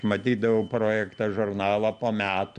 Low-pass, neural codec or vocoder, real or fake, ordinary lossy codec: 9.9 kHz; none; real; AAC, 96 kbps